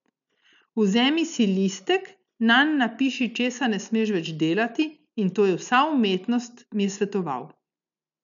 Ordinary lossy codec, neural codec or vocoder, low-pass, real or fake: none; none; 7.2 kHz; real